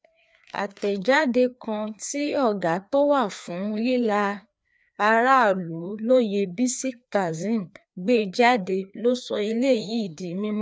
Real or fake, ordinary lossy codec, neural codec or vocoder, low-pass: fake; none; codec, 16 kHz, 2 kbps, FreqCodec, larger model; none